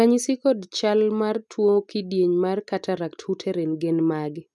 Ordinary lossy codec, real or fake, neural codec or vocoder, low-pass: none; real; none; none